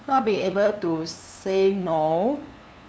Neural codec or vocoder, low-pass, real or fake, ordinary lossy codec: codec, 16 kHz, 2 kbps, FunCodec, trained on LibriTTS, 25 frames a second; none; fake; none